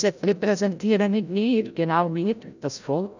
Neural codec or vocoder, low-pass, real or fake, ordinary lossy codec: codec, 16 kHz, 0.5 kbps, FreqCodec, larger model; 7.2 kHz; fake; none